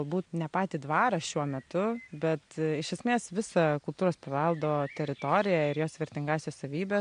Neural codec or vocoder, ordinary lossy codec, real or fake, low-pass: none; MP3, 64 kbps; real; 9.9 kHz